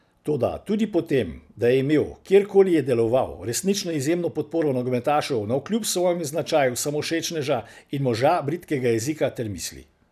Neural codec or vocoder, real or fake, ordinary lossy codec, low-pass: none; real; none; 14.4 kHz